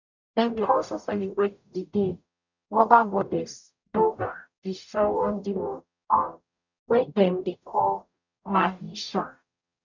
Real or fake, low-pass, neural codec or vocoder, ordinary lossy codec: fake; 7.2 kHz; codec, 44.1 kHz, 0.9 kbps, DAC; none